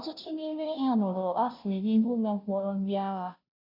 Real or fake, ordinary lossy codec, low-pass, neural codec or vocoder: fake; none; 5.4 kHz; codec, 16 kHz, 0.5 kbps, FunCodec, trained on Chinese and English, 25 frames a second